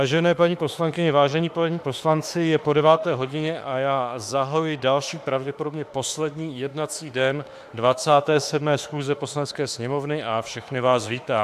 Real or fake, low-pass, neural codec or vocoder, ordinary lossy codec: fake; 14.4 kHz; autoencoder, 48 kHz, 32 numbers a frame, DAC-VAE, trained on Japanese speech; AAC, 96 kbps